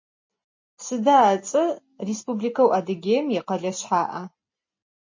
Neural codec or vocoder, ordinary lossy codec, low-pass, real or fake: none; MP3, 32 kbps; 7.2 kHz; real